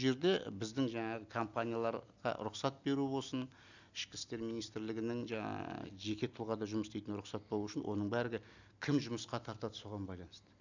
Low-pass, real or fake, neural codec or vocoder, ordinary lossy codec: 7.2 kHz; real; none; none